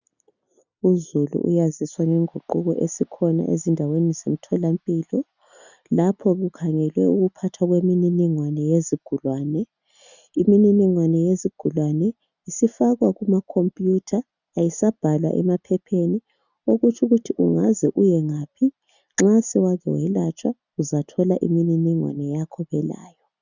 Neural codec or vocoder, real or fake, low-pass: none; real; 7.2 kHz